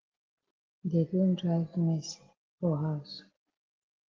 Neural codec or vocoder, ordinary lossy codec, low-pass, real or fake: none; Opus, 16 kbps; 7.2 kHz; real